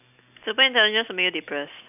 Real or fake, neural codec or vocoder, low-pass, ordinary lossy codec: real; none; 3.6 kHz; none